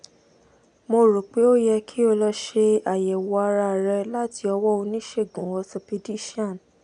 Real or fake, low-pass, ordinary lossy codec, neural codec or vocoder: real; 9.9 kHz; none; none